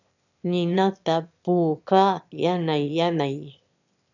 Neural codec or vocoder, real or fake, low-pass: autoencoder, 22.05 kHz, a latent of 192 numbers a frame, VITS, trained on one speaker; fake; 7.2 kHz